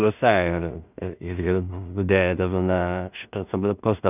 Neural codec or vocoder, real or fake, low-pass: codec, 16 kHz in and 24 kHz out, 0.4 kbps, LongCat-Audio-Codec, two codebook decoder; fake; 3.6 kHz